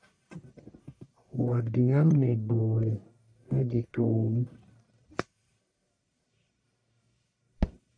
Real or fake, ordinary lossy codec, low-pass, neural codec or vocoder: fake; MP3, 64 kbps; 9.9 kHz; codec, 44.1 kHz, 1.7 kbps, Pupu-Codec